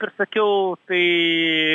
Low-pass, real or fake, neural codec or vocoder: 10.8 kHz; real; none